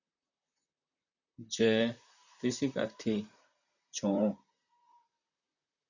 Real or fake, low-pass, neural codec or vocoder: fake; 7.2 kHz; vocoder, 44.1 kHz, 128 mel bands, Pupu-Vocoder